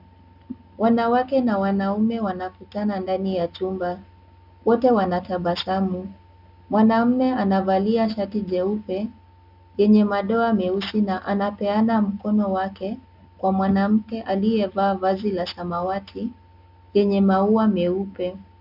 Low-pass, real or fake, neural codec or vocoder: 5.4 kHz; real; none